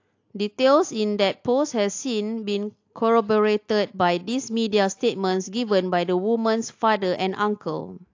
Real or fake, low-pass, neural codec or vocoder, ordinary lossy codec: real; 7.2 kHz; none; AAC, 48 kbps